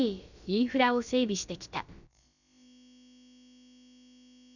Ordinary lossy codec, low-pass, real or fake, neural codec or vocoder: none; 7.2 kHz; fake; codec, 16 kHz, about 1 kbps, DyCAST, with the encoder's durations